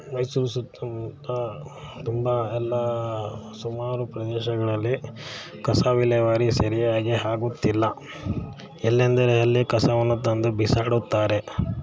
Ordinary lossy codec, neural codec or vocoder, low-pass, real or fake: none; none; none; real